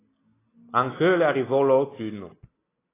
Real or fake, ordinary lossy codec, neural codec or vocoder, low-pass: real; AAC, 16 kbps; none; 3.6 kHz